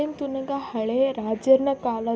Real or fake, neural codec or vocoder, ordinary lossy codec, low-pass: real; none; none; none